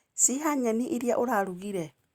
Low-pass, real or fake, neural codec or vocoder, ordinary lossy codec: 19.8 kHz; real; none; Opus, 64 kbps